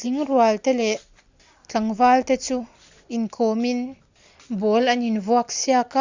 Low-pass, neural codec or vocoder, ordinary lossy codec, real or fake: 7.2 kHz; none; Opus, 64 kbps; real